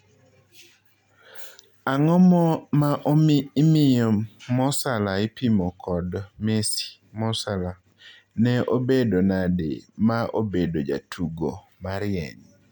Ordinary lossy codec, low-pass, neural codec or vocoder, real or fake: none; 19.8 kHz; none; real